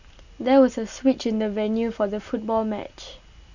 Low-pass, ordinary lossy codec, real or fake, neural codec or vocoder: 7.2 kHz; none; real; none